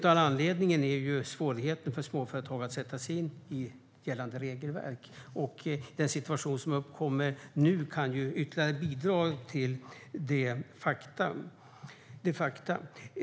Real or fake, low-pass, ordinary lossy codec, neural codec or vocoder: real; none; none; none